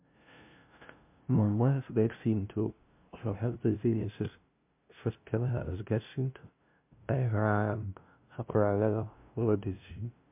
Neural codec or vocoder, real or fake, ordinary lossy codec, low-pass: codec, 16 kHz, 0.5 kbps, FunCodec, trained on LibriTTS, 25 frames a second; fake; MP3, 32 kbps; 3.6 kHz